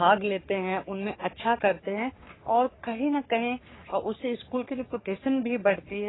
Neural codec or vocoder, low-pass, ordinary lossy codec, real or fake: codec, 44.1 kHz, 3.4 kbps, Pupu-Codec; 7.2 kHz; AAC, 16 kbps; fake